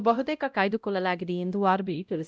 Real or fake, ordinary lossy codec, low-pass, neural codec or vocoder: fake; none; none; codec, 16 kHz, 0.5 kbps, X-Codec, WavLM features, trained on Multilingual LibriSpeech